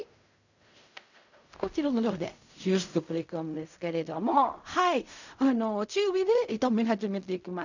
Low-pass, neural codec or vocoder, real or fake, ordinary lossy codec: 7.2 kHz; codec, 16 kHz in and 24 kHz out, 0.4 kbps, LongCat-Audio-Codec, fine tuned four codebook decoder; fake; none